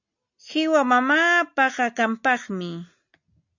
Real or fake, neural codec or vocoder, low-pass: real; none; 7.2 kHz